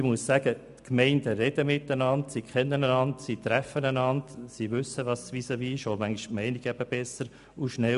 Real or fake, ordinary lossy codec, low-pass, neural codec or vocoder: real; none; 10.8 kHz; none